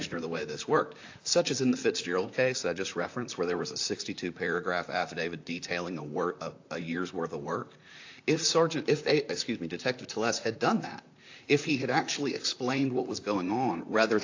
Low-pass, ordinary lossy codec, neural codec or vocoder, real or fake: 7.2 kHz; AAC, 48 kbps; vocoder, 44.1 kHz, 128 mel bands, Pupu-Vocoder; fake